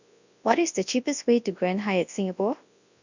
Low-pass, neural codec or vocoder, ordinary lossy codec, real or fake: 7.2 kHz; codec, 24 kHz, 0.9 kbps, WavTokenizer, large speech release; none; fake